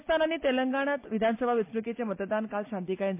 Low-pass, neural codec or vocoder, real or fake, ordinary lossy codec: 3.6 kHz; none; real; MP3, 24 kbps